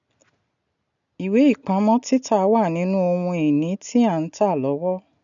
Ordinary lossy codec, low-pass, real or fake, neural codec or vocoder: none; 7.2 kHz; real; none